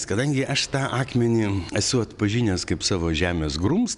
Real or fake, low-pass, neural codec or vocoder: real; 10.8 kHz; none